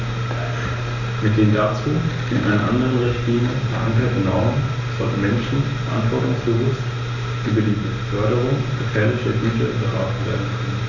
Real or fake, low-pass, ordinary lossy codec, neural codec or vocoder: real; 7.2 kHz; none; none